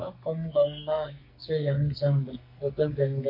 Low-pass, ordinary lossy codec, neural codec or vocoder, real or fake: 5.4 kHz; MP3, 32 kbps; codec, 44.1 kHz, 2.6 kbps, SNAC; fake